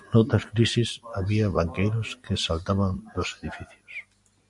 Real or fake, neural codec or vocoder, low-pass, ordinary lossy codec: real; none; 10.8 kHz; MP3, 64 kbps